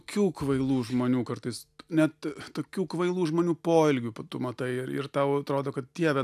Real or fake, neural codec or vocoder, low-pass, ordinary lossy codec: real; none; 14.4 kHz; AAC, 96 kbps